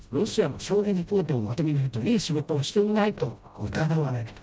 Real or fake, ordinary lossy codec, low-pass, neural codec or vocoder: fake; none; none; codec, 16 kHz, 0.5 kbps, FreqCodec, smaller model